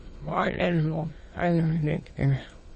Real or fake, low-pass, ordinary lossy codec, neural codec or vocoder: fake; 9.9 kHz; MP3, 32 kbps; autoencoder, 22.05 kHz, a latent of 192 numbers a frame, VITS, trained on many speakers